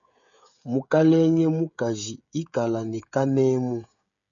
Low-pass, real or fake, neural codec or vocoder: 7.2 kHz; fake; codec, 16 kHz, 16 kbps, FreqCodec, smaller model